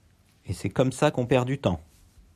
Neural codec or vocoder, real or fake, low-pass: none; real; 14.4 kHz